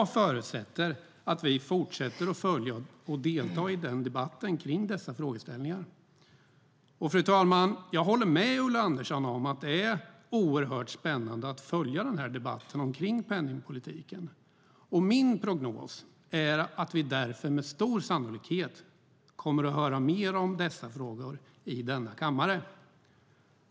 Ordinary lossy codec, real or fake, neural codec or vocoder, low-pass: none; real; none; none